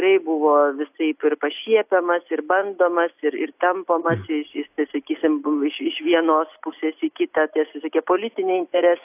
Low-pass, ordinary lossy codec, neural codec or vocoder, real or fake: 3.6 kHz; AAC, 32 kbps; none; real